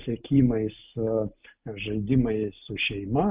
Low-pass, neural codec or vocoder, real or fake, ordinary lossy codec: 3.6 kHz; none; real; Opus, 32 kbps